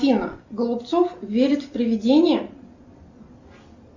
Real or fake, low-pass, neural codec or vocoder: real; 7.2 kHz; none